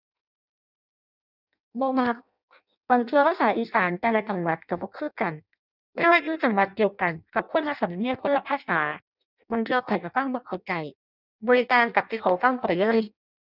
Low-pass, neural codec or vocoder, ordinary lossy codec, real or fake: 5.4 kHz; codec, 16 kHz in and 24 kHz out, 0.6 kbps, FireRedTTS-2 codec; none; fake